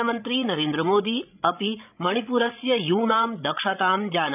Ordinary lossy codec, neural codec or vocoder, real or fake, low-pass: none; codec, 16 kHz, 16 kbps, FreqCodec, larger model; fake; 3.6 kHz